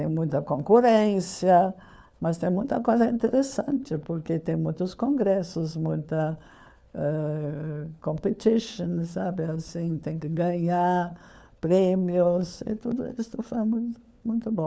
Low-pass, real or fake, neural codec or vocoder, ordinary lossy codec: none; fake; codec, 16 kHz, 4 kbps, FunCodec, trained on LibriTTS, 50 frames a second; none